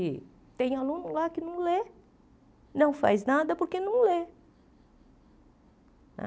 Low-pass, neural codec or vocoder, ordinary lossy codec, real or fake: none; none; none; real